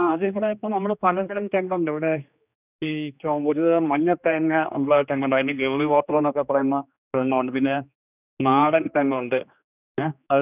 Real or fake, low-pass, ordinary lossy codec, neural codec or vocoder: fake; 3.6 kHz; none; codec, 16 kHz, 2 kbps, X-Codec, HuBERT features, trained on general audio